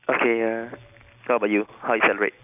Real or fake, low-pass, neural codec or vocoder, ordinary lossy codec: real; 3.6 kHz; none; none